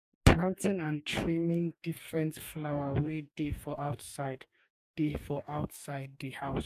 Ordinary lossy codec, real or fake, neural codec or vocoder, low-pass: MP3, 96 kbps; fake; codec, 44.1 kHz, 2.6 kbps, DAC; 14.4 kHz